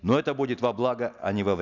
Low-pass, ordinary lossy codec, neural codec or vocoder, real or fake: 7.2 kHz; none; none; real